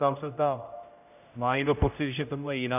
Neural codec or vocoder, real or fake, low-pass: codec, 16 kHz, 0.5 kbps, X-Codec, HuBERT features, trained on general audio; fake; 3.6 kHz